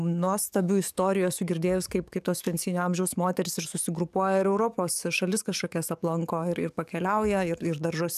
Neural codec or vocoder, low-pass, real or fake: codec, 44.1 kHz, 7.8 kbps, DAC; 14.4 kHz; fake